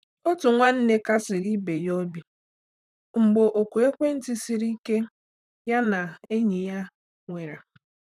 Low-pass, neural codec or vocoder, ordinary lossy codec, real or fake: 14.4 kHz; vocoder, 44.1 kHz, 128 mel bands, Pupu-Vocoder; none; fake